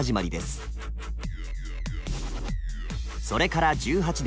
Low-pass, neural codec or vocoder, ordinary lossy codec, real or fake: none; none; none; real